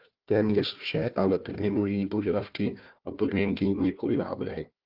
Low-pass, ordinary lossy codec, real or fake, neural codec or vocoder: 5.4 kHz; Opus, 32 kbps; fake; codec, 16 kHz, 1 kbps, FunCodec, trained on Chinese and English, 50 frames a second